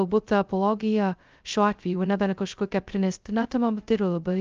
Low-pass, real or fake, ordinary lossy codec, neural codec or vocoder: 7.2 kHz; fake; Opus, 24 kbps; codec, 16 kHz, 0.2 kbps, FocalCodec